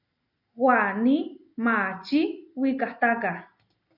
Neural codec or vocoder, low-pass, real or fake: none; 5.4 kHz; real